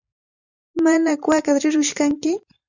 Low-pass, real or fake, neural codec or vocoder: 7.2 kHz; fake; vocoder, 44.1 kHz, 128 mel bands every 512 samples, BigVGAN v2